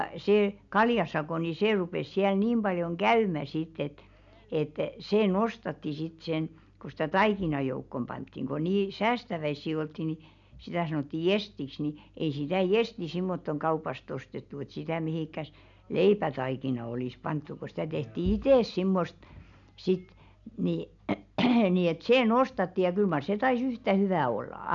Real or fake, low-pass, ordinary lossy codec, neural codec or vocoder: real; 7.2 kHz; none; none